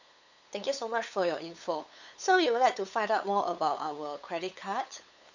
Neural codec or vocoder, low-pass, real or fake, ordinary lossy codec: codec, 16 kHz, 8 kbps, FunCodec, trained on LibriTTS, 25 frames a second; 7.2 kHz; fake; none